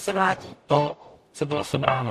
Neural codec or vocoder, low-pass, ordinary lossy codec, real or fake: codec, 44.1 kHz, 0.9 kbps, DAC; 14.4 kHz; AAC, 64 kbps; fake